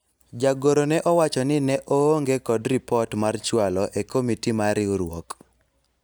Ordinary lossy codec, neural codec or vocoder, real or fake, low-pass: none; none; real; none